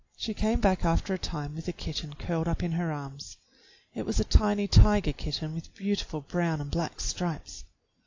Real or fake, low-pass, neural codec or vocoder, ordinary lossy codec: real; 7.2 kHz; none; AAC, 48 kbps